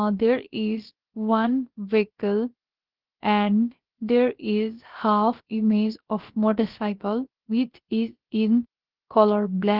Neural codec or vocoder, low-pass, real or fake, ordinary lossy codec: codec, 16 kHz, about 1 kbps, DyCAST, with the encoder's durations; 5.4 kHz; fake; Opus, 16 kbps